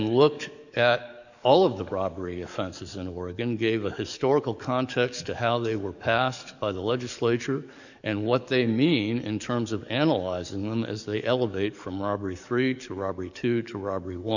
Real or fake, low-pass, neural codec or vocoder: fake; 7.2 kHz; codec, 44.1 kHz, 7.8 kbps, DAC